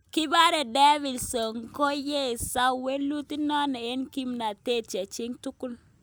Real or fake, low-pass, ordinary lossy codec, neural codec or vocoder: real; none; none; none